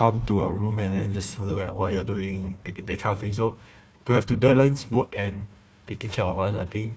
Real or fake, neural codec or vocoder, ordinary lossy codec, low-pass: fake; codec, 16 kHz, 1 kbps, FunCodec, trained on Chinese and English, 50 frames a second; none; none